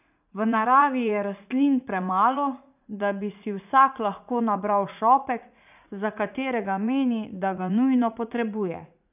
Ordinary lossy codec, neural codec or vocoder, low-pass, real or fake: none; vocoder, 44.1 kHz, 80 mel bands, Vocos; 3.6 kHz; fake